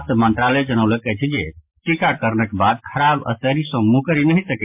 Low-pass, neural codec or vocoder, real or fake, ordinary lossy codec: 3.6 kHz; none; real; MP3, 32 kbps